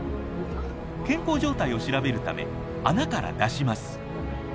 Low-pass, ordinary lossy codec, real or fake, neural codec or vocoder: none; none; real; none